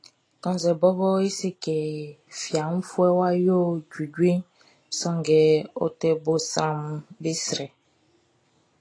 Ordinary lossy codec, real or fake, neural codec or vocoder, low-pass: AAC, 32 kbps; real; none; 9.9 kHz